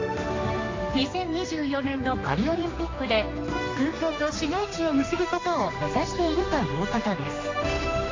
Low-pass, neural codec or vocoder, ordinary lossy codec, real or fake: 7.2 kHz; codec, 16 kHz, 2 kbps, X-Codec, HuBERT features, trained on general audio; AAC, 32 kbps; fake